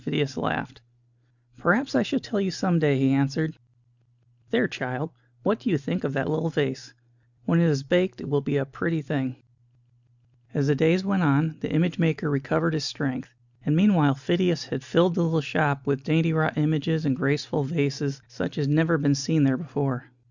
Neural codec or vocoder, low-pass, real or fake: none; 7.2 kHz; real